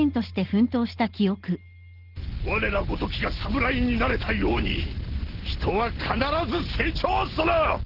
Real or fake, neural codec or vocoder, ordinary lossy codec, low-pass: real; none; Opus, 16 kbps; 5.4 kHz